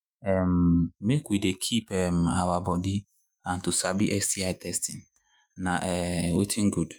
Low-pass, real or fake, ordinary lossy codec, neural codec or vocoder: none; fake; none; autoencoder, 48 kHz, 128 numbers a frame, DAC-VAE, trained on Japanese speech